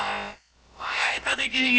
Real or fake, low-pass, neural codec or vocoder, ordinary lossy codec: fake; none; codec, 16 kHz, about 1 kbps, DyCAST, with the encoder's durations; none